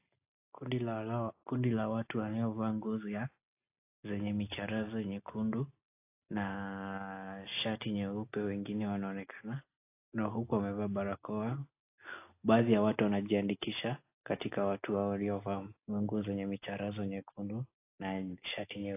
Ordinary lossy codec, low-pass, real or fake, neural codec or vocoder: AAC, 24 kbps; 3.6 kHz; real; none